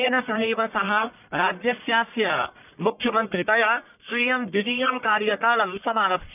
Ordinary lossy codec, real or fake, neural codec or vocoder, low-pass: none; fake; codec, 44.1 kHz, 1.7 kbps, Pupu-Codec; 3.6 kHz